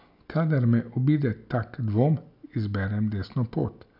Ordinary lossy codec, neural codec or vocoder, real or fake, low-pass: none; none; real; 5.4 kHz